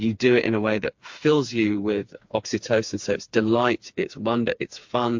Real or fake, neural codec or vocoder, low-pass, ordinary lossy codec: fake; codec, 16 kHz, 4 kbps, FreqCodec, smaller model; 7.2 kHz; MP3, 48 kbps